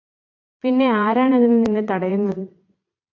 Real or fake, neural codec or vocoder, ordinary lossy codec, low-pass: fake; vocoder, 22.05 kHz, 80 mel bands, Vocos; AAC, 48 kbps; 7.2 kHz